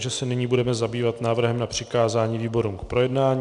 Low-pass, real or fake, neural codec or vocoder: 10.8 kHz; real; none